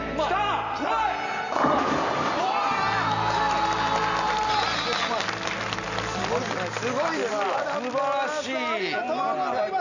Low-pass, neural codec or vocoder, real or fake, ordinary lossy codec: 7.2 kHz; none; real; none